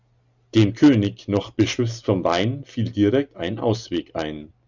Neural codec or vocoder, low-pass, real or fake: none; 7.2 kHz; real